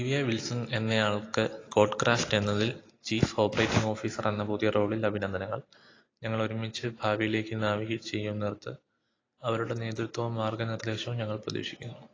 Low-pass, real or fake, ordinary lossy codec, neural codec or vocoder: 7.2 kHz; real; AAC, 32 kbps; none